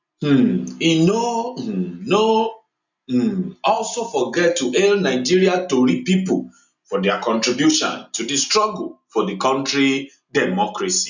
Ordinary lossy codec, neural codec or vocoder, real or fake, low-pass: none; none; real; 7.2 kHz